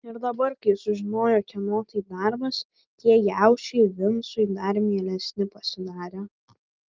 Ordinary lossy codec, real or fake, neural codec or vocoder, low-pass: Opus, 32 kbps; real; none; 7.2 kHz